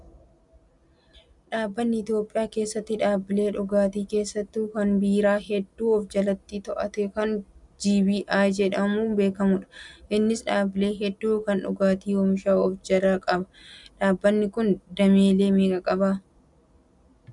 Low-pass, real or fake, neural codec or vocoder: 10.8 kHz; real; none